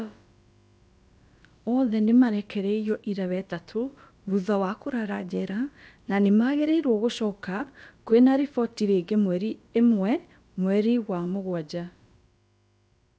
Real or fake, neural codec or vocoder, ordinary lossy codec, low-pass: fake; codec, 16 kHz, about 1 kbps, DyCAST, with the encoder's durations; none; none